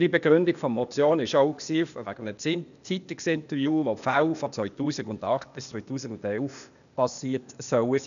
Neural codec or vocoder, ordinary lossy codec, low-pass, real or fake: codec, 16 kHz, 0.8 kbps, ZipCodec; none; 7.2 kHz; fake